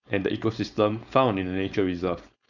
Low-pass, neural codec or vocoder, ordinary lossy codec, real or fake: 7.2 kHz; codec, 16 kHz, 4.8 kbps, FACodec; none; fake